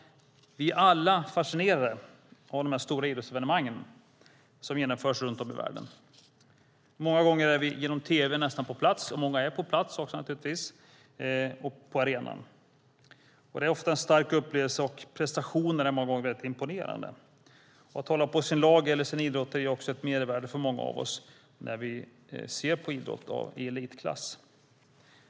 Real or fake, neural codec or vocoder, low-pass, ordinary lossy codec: real; none; none; none